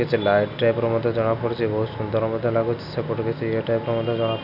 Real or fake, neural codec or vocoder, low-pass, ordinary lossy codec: real; none; 5.4 kHz; none